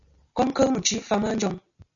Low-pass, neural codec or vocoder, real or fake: 7.2 kHz; none; real